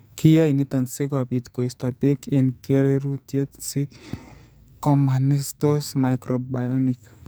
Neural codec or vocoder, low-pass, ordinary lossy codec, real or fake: codec, 44.1 kHz, 2.6 kbps, SNAC; none; none; fake